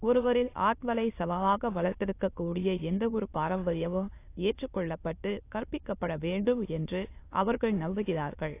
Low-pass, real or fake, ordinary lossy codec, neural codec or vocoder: 3.6 kHz; fake; AAC, 24 kbps; autoencoder, 22.05 kHz, a latent of 192 numbers a frame, VITS, trained on many speakers